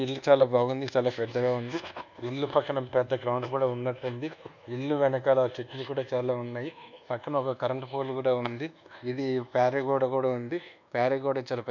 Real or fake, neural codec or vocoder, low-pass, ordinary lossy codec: fake; codec, 24 kHz, 1.2 kbps, DualCodec; 7.2 kHz; none